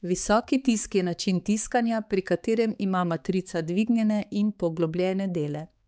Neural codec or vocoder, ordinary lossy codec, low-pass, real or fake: codec, 16 kHz, 4 kbps, X-Codec, HuBERT features, trained on balanced general audio; none; none; fake